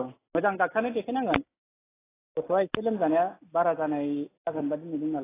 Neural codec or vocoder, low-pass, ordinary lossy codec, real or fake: none; 3.6 kHz; AAC, 16 kbps; real